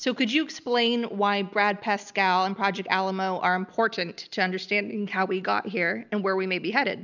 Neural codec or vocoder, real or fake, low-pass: none; real; 7.2 kHz